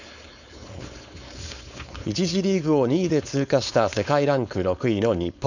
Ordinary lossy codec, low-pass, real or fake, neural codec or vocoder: none; 7.2 kHz; fake; codec, 16 kHz, 4.8 kbps, FACodec